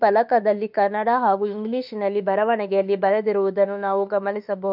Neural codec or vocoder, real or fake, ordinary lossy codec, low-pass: autoencoder, 48 kHz, 32 numbers a frame, DAC-VAE, trained on Japanese speech; fake; none; 5.4 kHz